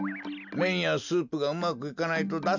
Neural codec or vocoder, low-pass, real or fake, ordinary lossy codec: none; 7.2 kHz; real; none